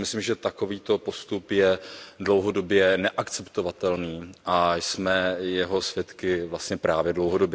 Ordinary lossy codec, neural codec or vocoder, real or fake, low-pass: none; none; real; none